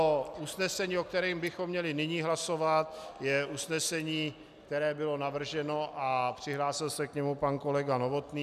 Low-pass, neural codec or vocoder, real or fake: 14.4 kHz; none; real